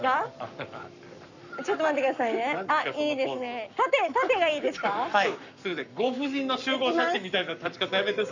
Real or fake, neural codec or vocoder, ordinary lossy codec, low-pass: fake; codec, 44.1 kHz, 7.8 kbps, Pupu-Codec; none; 7.2 kHz